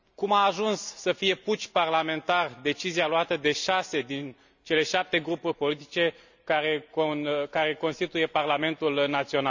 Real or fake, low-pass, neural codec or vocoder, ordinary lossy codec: real; 7.2 kHz; none; none